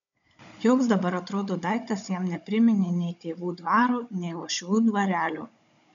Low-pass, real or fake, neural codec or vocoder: 7.2 kHz; fake; codec, 16 kHz, 16 kbps, FunCodec, trained on Chinese and English, 50 frames a second